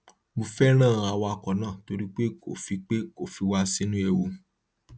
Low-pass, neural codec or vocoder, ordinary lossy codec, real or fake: none; none; none; real